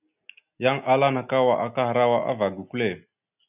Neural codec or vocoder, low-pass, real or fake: none; 3.6 kHz; real